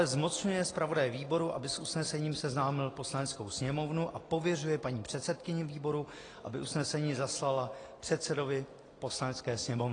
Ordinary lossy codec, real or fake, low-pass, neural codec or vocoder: AAC, 32 kbps; real; 9.9 kHz; none